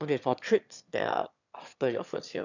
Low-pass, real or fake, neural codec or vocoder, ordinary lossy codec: 7.2 kHz; fake; autoencoder, 22.05 kHz, a latent of 192 numbers a frame, VITS, trained on one speaker; none